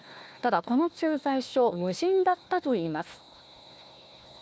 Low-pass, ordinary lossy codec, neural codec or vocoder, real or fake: none; none; codec, 16 kHz, 1 kbps, FunCodec, trained on Chinese and English, 50 frames a second; fake